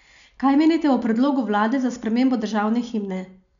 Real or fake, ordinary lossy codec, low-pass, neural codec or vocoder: real; none; 7.2 kHz; none